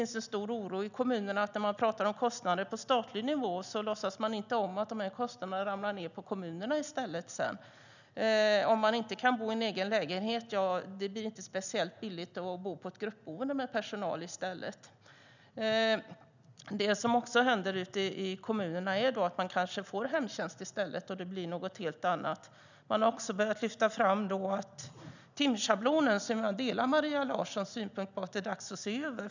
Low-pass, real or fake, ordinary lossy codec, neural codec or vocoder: 7.2 kHz; real; none; none